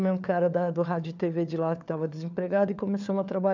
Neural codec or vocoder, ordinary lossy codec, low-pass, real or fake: codec, 16 kHz, 4 kbps, FunCodec, trained on LibriTTS, 50 frames a second; none; 7.2 kHz; fake